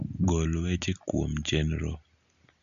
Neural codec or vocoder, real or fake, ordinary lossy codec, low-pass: none; real; none; 7.2 kHz